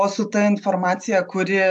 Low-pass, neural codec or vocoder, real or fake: 10.8 kHz; none; real